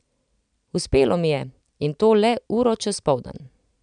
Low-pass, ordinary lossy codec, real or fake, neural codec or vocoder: 9.9 kHz; none; real; none